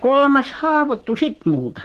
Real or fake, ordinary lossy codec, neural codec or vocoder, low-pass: fake; Opus, 16 kbps; codec, 44.1 kHz, 3.4 kbps, Pupu-Codec; 14.4 kHz